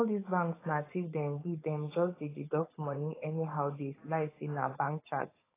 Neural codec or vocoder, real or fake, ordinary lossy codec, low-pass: codec, 16 kHz, 4.8 kbps, FACodec; fake; AAC, 16 kbps; 3.6 kHz